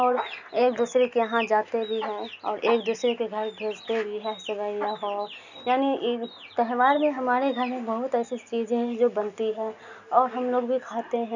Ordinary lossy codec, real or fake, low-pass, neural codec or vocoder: none; real; 7.2 kHz; none